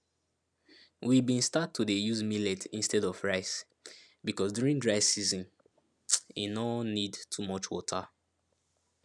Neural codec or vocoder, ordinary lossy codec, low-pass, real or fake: none; none; none; real